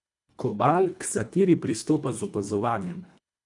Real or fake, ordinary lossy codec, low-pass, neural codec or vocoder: fake; none; 10.8 kHz; codec, 24 kHz, 1.5 kbps, HILCodec